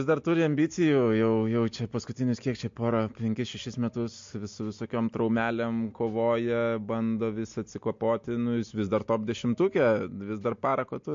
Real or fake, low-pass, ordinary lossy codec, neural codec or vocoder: real; 7.2 kHz; MP3, 48 kbps; none